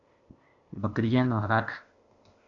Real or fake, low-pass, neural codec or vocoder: fake; 7.2 kHz; codec, 16 kHz, 0.8 kbps, ZipCodec